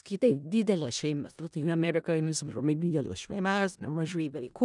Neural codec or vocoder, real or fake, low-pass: codec, 16 kHz in and 24 kHz out, 0.4 kbps, LongCat-Audio-Codec, four codebook decoder; fake; 10.8 kHz